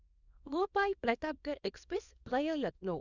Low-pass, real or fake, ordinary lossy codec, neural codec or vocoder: 7.2 kHz; fake; none; codec, 24 kHz, 0.9 kbps, WavTokenizer, small release